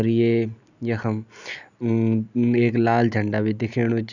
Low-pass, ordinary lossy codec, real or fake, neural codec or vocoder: 7.2 kHz; none; real; none